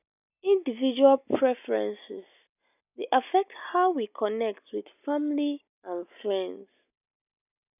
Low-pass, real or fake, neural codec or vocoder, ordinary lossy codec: 3.6 kHz; real; none; none